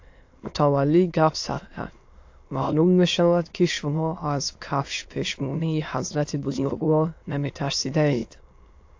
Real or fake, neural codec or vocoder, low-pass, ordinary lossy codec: fake; autoencoder, 22.05 kHz, a latent of 192 numbers a frame, VITS, trained on many speakers; 7.2 kHz; AAC, 48 kbps